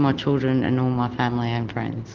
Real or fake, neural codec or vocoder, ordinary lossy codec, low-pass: real; none; Opus, 16 kbps; 7.2 kHz